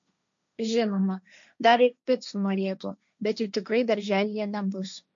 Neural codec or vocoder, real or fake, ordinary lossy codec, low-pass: codec, 16 kHz, 1.1 kbps, Voila-Tokenizer; fake; MP3, 64 kbps; 7.2 kHz